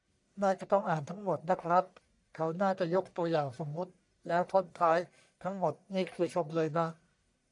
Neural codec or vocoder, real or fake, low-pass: codec, 44.1 kHz, 1.7 kbps, Pupu-Codec; fake; 10.8 kHz